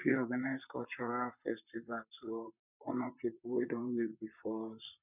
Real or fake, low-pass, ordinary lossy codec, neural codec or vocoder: fake; 3.6 kHz; none; vocoder, 44.1 kHz, 128 mel bands, Pupu-Vocoder